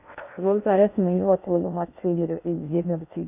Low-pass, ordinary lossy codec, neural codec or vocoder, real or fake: 3.6 kHz; none; codec, 16 kHz in and 24 kHz out, 0.6 kbps, FocalCodec, streaming, 2048 codes; fake